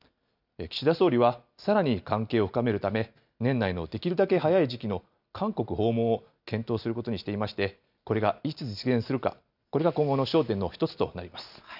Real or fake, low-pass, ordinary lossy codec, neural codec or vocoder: real; 5.4 kHz; none; none